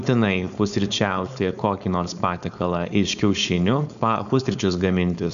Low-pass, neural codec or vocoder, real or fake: 7.2 kHz; codec, 16 kHz, 4.8 kbps, FACodec; fake